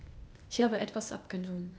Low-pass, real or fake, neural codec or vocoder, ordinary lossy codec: none; fake; codec, 16 kHz, 0.8 kbps, ZipCodec; none